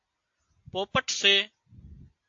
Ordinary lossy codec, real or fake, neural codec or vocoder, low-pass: AAC, 48 kbps; real; none; 7.2 kHz